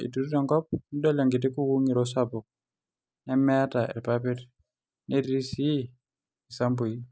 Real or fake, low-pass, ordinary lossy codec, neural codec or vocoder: real; none; none; none